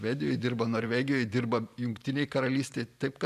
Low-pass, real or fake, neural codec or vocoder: 14.4 kHz; fake; vocoder, 44.1 kHz, 128 mel bands every 512 samples, BigVGAN v2